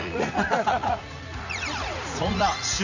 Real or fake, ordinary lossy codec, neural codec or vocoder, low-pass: fake; none; vocoder, 44.1 kHz, 80 mel bands, Vocos; 7.2 kHz